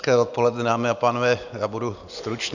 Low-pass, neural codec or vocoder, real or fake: 7.2 kHz; none; real